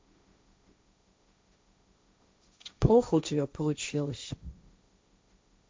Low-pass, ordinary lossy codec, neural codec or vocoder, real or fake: none; none; codec, 16 kHz, 1.1 kbps, Voila-Tokenizer; fake